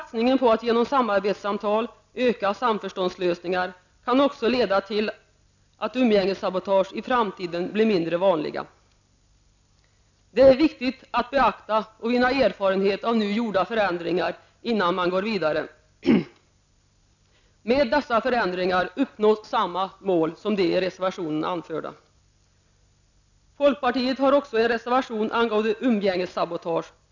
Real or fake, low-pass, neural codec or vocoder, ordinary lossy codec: real; 7.2 kHz; none; none